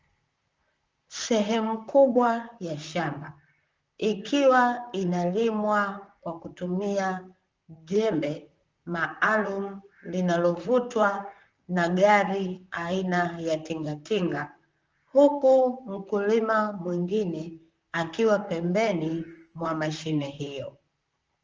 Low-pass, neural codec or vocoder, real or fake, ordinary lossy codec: 7.2 kHz; vocoder, 44.1 kHz, 128 mel bands, Pupu-Vocoder; fake; Opus, 24 kbps